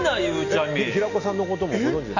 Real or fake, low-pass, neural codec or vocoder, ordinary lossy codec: real; 7.2 kHz; none; none